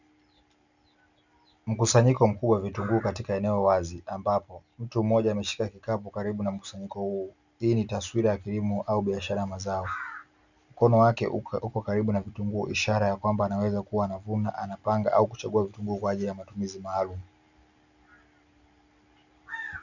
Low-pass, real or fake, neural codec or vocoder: 7.2 kHz; real; none